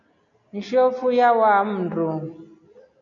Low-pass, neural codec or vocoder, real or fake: 7.2 kHz; none; real